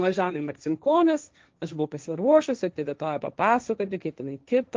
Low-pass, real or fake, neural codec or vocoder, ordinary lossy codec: 7.2 kHz; fake; codec, 16 kHz, 1.1 kbps, Voila-Tokenizer; Opus, 24 kbps